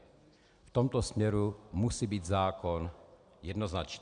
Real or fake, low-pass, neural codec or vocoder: real; 9.9 kHz; none